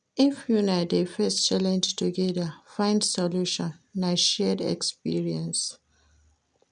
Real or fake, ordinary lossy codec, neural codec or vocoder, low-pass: real; none; none; 9.9 kHz